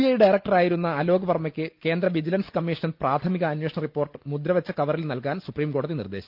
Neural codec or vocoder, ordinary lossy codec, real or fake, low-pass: none; Opus, 24 kbps; real; 5.4 kHz